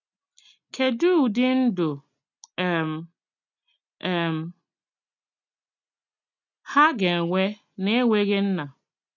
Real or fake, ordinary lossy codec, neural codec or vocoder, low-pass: real; none; none; 7.2 kHz